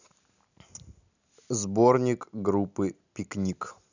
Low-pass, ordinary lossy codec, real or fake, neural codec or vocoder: 7.2 kHz; none; real; none